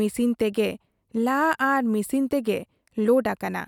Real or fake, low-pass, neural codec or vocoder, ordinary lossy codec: real; 19.8 kHz; none; none